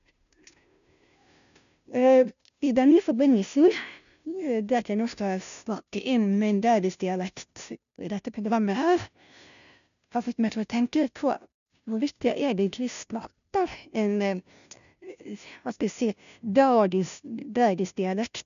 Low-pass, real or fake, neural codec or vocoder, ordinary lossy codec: 7.2 kHz; fake; codec, 16 kHz, 0.5 kbps, FunCodec, trained on Chinese and English, 25 frames a second; none